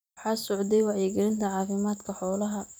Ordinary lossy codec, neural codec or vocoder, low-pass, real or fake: none; vocoder, 44.1 kHz, 128 mel bands every 256 samples, BigVGAN v2; none; fake